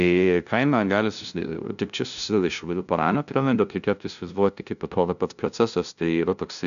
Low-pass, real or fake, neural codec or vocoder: 7.2 kHz; fake; codec, 16 kHz, 0.5 kbps, FunCodec, trained on LibriTTS, 25 frames a second